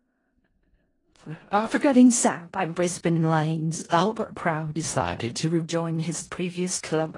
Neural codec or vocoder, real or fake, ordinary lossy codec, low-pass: codec, 16 kHz in and 24 kHz out, 0.4 kbps, LongCat-Audio-Codec, four codebook decoder; fake; AAC, 32 kbps; 10.8 kHz